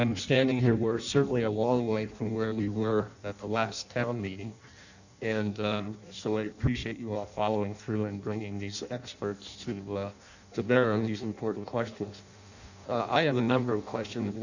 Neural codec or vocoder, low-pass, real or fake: codec, 16 kHz in and 24 kHz out, 0.6 kbps, FireRedTTS-2 codec; 7.2 kHz; fake